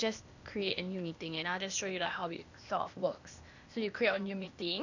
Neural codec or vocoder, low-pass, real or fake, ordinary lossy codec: codec, 16 kHz, 0.8 kbps, ZipCodec; 7.2 kHz; fake; none